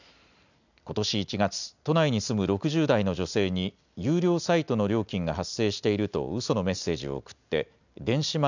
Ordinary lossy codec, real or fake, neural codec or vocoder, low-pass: none; real; none; 7.2 kHz